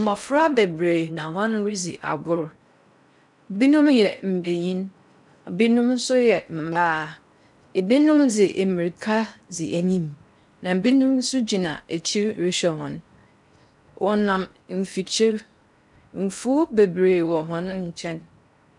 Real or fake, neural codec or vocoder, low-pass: fake; codec, 16 kHz in and 24 kHz out, 0.6 kbps, FocalCodec, streaming, 4096 codes; 10.8 kHz